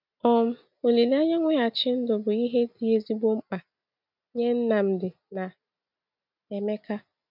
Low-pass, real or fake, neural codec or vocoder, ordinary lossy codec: 5.4 kHz; real; none; none